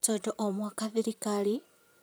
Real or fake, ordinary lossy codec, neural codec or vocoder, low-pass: fake; none; vocoder, 44.1 kHz, 128 mel bands, Pupu-Vocoder; none